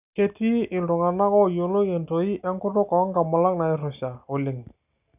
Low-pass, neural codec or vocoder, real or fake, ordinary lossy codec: 3.6 kHz; none; real; none